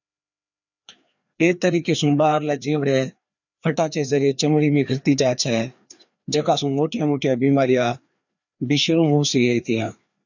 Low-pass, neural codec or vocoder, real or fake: 7.2 kHz; codec, 16 kHz, 2 kbps, FreqCodec, larger model; fake